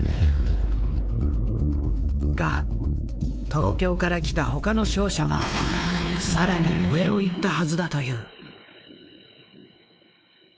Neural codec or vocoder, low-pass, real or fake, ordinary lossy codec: codec, 16 kHz, 2 kbps, X-Codec, WavLM features, trained on Multilingual LibriSpeech; none; fake; none